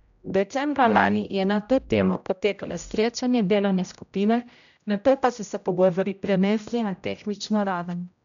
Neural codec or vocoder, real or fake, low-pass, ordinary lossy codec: codec, 16 kHz, 0.5 kbps, X-Codec, HuBERT features, trained on general audio; fake; 7.2 kHz; none